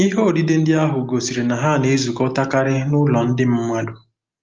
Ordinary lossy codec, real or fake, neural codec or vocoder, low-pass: Opus, 32 kbps; real; none; 7.2 kHz